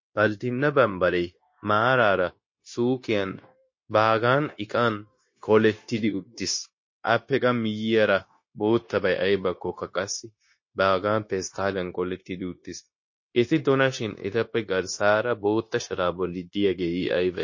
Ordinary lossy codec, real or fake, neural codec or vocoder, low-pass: MP3, 32 kbps; fake; codec, 16 kHz, 0.9 kbps, LongCat-Audio-Codec; 7.2 kHz